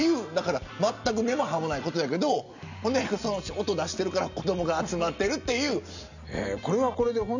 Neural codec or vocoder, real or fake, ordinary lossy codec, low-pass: none; real; none; 7.2 kHz